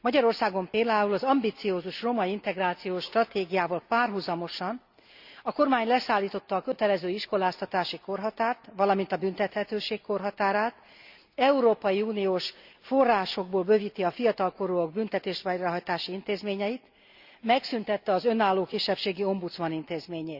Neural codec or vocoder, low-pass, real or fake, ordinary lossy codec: none; 5.4 kHz; real; Opus, 64 kbps